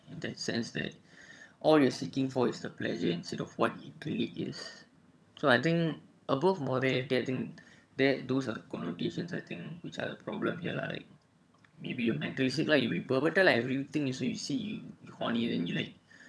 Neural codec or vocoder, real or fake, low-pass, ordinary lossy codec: vocoder, 22.05 kHz, 80 mel bands, HiFi-GAN; fake; none; none